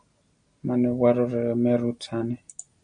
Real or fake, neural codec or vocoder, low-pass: real; none; 9.9 kHz